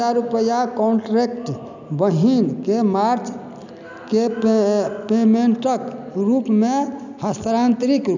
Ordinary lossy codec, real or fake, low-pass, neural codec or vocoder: none; real; 7.2 kHz; none